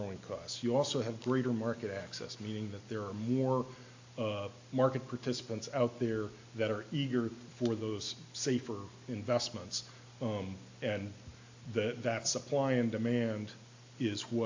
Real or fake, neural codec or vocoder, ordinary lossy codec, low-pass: real; none; AAC, 48 kbps; 7.2 kHz